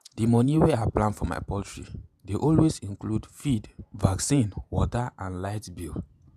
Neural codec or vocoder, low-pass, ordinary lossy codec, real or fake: vocoder, 48 kHz, 128 mel bands, Vocos; 14.4 kHz; none; fake